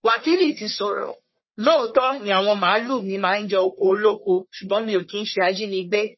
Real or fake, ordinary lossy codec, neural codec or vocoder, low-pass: fake; MP3, 24 kbps; codec, 44.1 kHz, 1.7 kbps, Pupu-Codec; 7.2 kHz